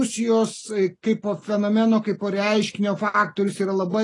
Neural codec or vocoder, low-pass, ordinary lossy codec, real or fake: none; 10.8 kHz; AAC, 32 kbps; real